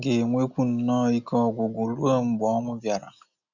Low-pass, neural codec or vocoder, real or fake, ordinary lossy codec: 7.2 kHz; none; real; none